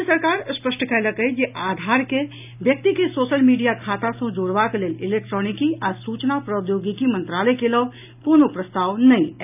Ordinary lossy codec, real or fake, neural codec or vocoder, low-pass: none; real; none; 3.6 kHz